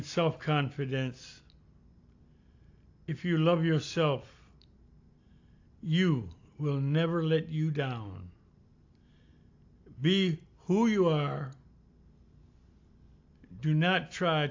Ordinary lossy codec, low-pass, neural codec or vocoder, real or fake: AAC, 48 kbps; 7.2 kHz; none; real